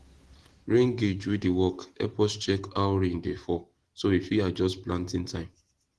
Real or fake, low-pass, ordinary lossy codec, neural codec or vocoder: real; 10.8 kHz; Opus, 16 kbps; none